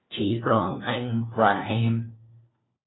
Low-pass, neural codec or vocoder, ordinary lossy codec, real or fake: 7.2 kHz; codec, 16 kHz, 1 kbps, FunCodec, trained on LibriTTS, 50 frames a second; AAC, 16 kbps; fake